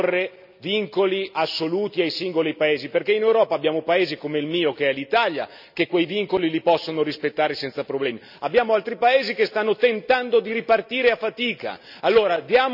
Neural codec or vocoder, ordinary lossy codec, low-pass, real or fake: none; none; 5.4 kHz; real